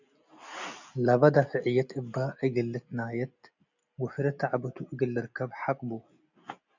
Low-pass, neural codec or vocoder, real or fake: 7.2 kHz; none; real